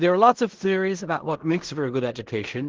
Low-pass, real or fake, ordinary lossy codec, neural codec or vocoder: 7.2 kHz; fake; Opus, 16 kbps; codec, 16 kHz in and 24 kHz out, 0.4 kbps, LongCat-Audio-Codec, fine tuned four codebook decoder